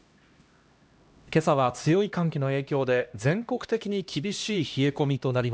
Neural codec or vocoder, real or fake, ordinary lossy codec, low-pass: codec, 16 kHz, 1 kbps, X-Codec, HuBERT features, trained on LibriSpeech; fake; none; none